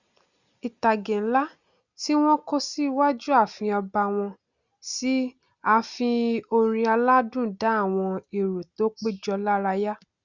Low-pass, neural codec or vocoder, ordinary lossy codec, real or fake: 7.2 kHz; none; Opus, 64 kbps; real